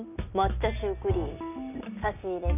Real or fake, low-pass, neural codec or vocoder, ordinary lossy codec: real; 3.6 kHz; none; none